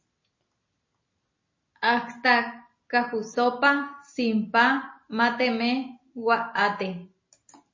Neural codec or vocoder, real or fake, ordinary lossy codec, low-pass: none; real; MP3, 32 kbps; 7.2 kHz